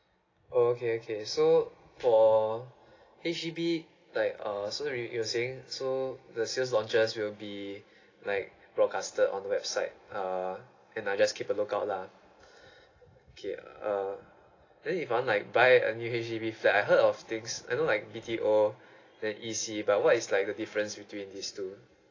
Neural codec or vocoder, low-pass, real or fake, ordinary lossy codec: none; 7.2 kHz; real; AAC, 32 kbps